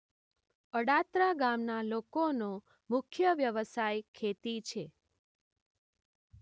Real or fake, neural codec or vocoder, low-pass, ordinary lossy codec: real; none; none; none